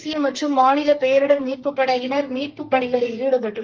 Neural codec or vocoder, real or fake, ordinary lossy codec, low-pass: codec, 32 kHz, 1.9 kbps, SNAC; fake; Opus, 24 kbps; 7.2 kHz